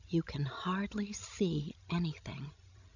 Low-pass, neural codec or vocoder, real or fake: 7.2 kHz; codec, 16 kHz, 16 kbps, FreqCodec, larger model; fake